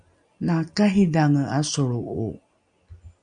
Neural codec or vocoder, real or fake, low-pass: none; real; 9.9 kHz